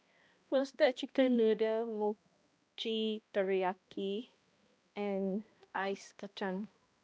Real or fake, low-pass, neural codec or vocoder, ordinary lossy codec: fake; none; codec, 16 kHz, 1 kbps, X-Codec, HuBERT features, trained on balanced general audio; none